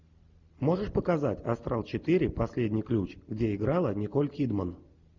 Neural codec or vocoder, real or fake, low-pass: none; real; 7.2 kHz